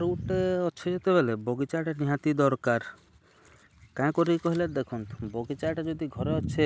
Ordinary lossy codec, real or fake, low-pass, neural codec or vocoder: none; real; none; none